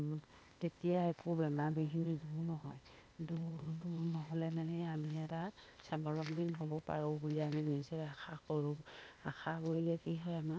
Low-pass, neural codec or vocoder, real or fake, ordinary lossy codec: none; codec, 16 kHz, 0.8 kbps, ZipCodec; fake; none